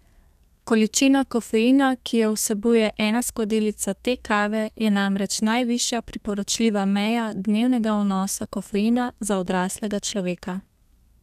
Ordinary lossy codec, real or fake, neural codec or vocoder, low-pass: none; fake; codec, 32 kHz, 1.9 kbps, SNAC; 14.4 kHz